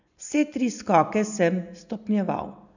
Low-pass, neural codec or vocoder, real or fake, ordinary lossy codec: 7.2 kHz; none; real; none